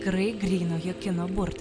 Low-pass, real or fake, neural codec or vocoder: 9.9 kHz; real; none